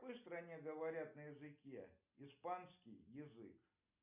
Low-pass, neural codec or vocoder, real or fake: 3.6 kHz; none; real